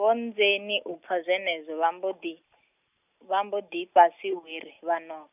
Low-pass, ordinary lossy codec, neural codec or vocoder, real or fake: 3.6 kHz; none; none; real